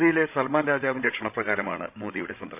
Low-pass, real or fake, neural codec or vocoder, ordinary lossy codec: 3.6 kHz; fake; codec, 16 kHz, 16 kbps, FreqCodec, larger model; none